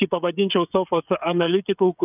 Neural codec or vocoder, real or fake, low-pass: codec, 16 kHz, 16 kbps, FreqCodec, smaller model; fake; 3.6 kHz